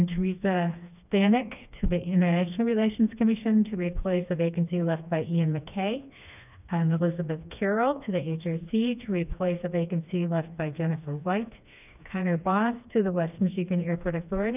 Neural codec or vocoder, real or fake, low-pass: codec, 16 kHz, 2 kbps, FreqCodec, smaller model; fake; 3.6 kHz